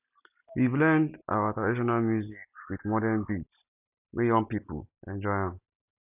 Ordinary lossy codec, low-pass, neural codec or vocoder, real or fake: none; 3.6 kHz; none; real